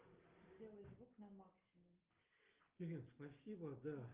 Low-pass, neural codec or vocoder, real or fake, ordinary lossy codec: 3.6 kHz; none; real; Opus, 32 kbps